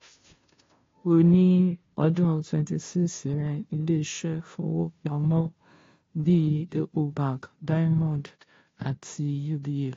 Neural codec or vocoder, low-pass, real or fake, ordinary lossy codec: codec, 16 kHz, 0.5 kbps, FunCodec, trained on Chinese and English, 25 frames a second; 7.2 kHz; fake; AAC, 32 kbps